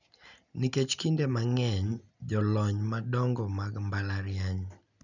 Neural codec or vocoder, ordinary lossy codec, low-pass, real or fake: none; none; 7.2 kHz; real